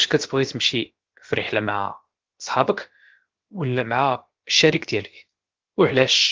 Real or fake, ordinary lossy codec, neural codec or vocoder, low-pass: fake; Opus, 16 kbps; codec, 16 kHz, about 1 kbps, DyCAST, with the encoder's durations; 7.2 kHz